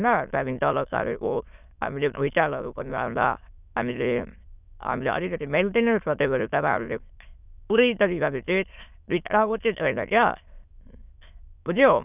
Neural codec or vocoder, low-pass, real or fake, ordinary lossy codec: autoencoder, 22.05 kHz, a latent of 192 numbers a frame, VITS, trained on many speakers; 3.6 kHz; fake; none